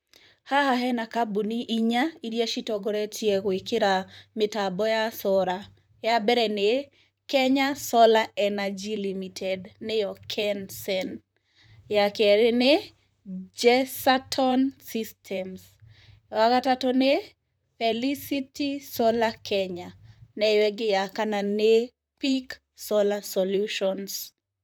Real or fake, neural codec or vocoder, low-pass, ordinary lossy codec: fake; vocoder, 44.1 kHz, 128 mel bands, Pupu-Vocoder; none; none